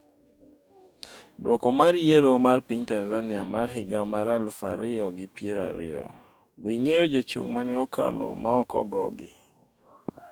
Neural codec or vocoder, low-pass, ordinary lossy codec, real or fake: codec, 44.1 kHz, 2.6 kbps, DAC; 19.8 kHz; none; fake